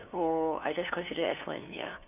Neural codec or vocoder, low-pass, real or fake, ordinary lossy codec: codec, 16 kHz, 2 kbps, FunCodec, trained on LibriTTS, 25 frames a second; 3.6 kHz; fake; none